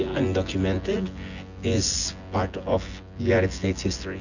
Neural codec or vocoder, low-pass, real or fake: vocoder, 24 kHz, 100 mel bands, Vocos; 7.2 kHz; fake